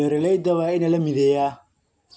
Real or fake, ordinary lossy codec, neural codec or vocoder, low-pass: real; none; none; none